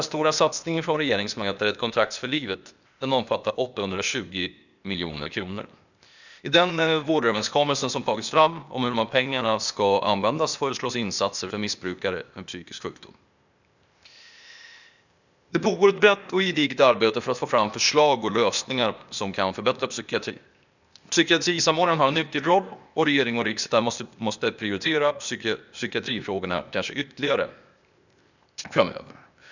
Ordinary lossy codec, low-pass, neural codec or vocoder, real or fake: none; 7.2 kHz; codec, 16 kHz, 0.8 kbps, ZipCodec; fake